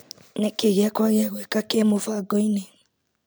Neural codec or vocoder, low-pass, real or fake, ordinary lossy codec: none; none; real; none